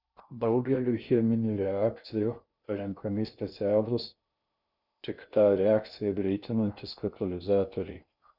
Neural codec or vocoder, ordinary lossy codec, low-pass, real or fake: codec, 16 kHz in and 24 kHz out, 0.6 kbps, FocalCodec, streaming, 2048 codes; Opus, 64 kbps; 5.4 kHz; fake